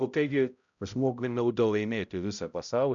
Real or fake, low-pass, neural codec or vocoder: fake; 7.2 kHz; codec, 16 kHz, 0.5 kbps, X-Codec, HuBERT features, trained on balanced general audio